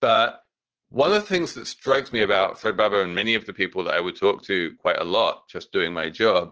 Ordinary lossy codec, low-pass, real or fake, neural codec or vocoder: Opus, 16 kbps; 7.2 kHz; fake; vocoder, 44.1 kHz, 80 mel bands, Vocos